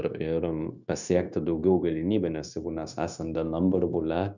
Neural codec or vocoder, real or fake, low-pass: codec, 16 kHz, 0.9 kbps, LongCat-Audio-Codec; fake; 7.2 kHz